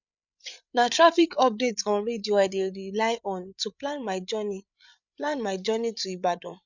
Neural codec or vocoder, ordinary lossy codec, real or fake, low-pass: codec, 16 kHz, 8 kbps, FreqCodec, larger model; none; fake; 7.2 kHz